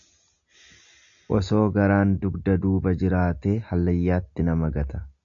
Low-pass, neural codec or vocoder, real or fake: 7.2 kHz; none; real